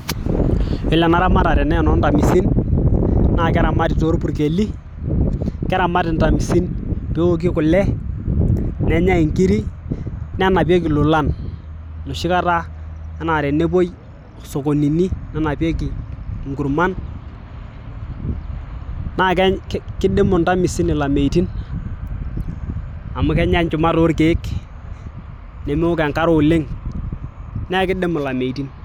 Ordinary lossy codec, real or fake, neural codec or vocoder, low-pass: none; real; none; 19.8 kHz